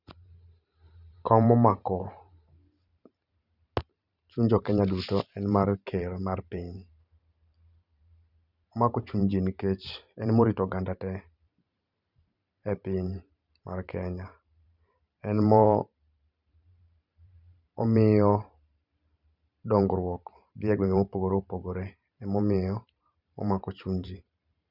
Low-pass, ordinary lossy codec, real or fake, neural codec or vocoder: 5.4 kHz; none; fake; vocoder, 44.1 kHz, 128 mel bands every 512 samples, BigVGAN v2